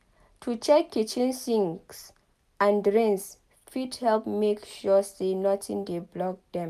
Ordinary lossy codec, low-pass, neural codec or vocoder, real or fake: none; 14.4 kHz; none; real